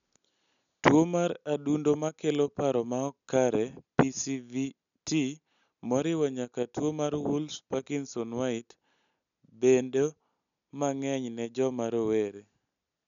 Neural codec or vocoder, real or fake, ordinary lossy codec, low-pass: none; real; none; 7.2 kHz